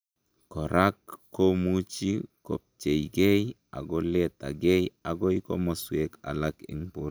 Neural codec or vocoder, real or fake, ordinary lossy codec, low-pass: none; real; none; none